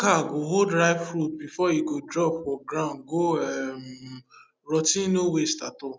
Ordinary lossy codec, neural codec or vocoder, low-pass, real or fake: none; none; none; real